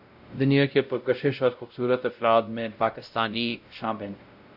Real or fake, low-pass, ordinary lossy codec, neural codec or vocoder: fake; 5.4 kHz; AAC, 48 kbps; codec, 16 kHz, 0.5 kbps, X-Codec, WavLM features, trained on Multilingual LibriSpeech